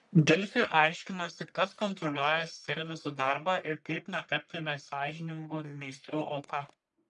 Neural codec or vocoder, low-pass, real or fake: codec, 44.1 kHz, 1.7 kbps, Pupu-Codec; 10.8 kHz; fake